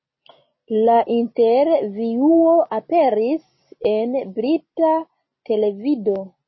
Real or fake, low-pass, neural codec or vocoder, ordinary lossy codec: real; 7.2 kHz; none; MP3, 24 kbps